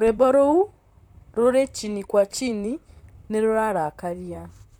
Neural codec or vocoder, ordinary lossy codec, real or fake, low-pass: vocoder, 44.1 kHz, 128 mel bands, Pupu-Vocoder; MP3, 96 kbps; fake; 19.8 kHz